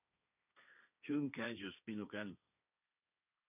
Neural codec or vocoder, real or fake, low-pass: codec, 16 kHz, 1.1 kbps, Voila-Tokenizer; fake; 3.6 kHz